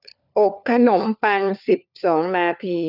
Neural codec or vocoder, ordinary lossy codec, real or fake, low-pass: codec, 16 kHz, 4 kbps, FunCodec, trained on LibriTTS, 50 frames a second; none; fake; 5.4 kHz